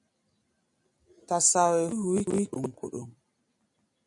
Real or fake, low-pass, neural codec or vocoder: real; 10.8 kHz; none